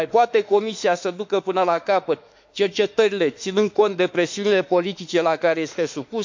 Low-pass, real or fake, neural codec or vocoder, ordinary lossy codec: 7.2 kHz; fake; autoencoder, 48 kHz, 32 numbers a frame, DAC-VAE, trained on Japanese speech; MP3, 64 kbps